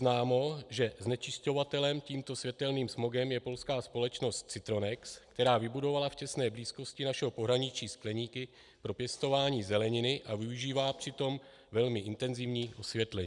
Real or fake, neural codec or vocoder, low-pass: real; none; 10.8 kHz